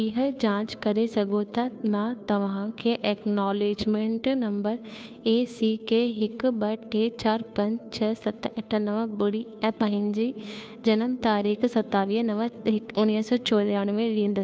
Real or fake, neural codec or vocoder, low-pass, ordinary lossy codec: fake; codec, 16 kHz, 2 kbps, FunCodec, trained on Chinese and English, 25 frames a second; none; none